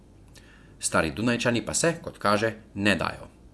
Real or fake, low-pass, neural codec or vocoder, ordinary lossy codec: fake; none; vocoder, 24 kHz, 100 mel bands, Vocos; none